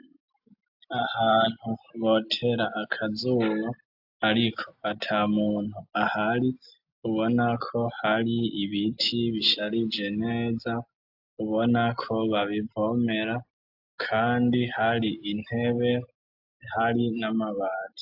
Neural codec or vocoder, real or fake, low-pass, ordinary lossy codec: none; real; 5.4 kHz; AAC, 48 kbps